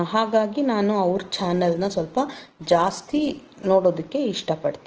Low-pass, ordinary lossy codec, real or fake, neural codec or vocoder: 7.2 kHz; Opus, 16 kbps; real; none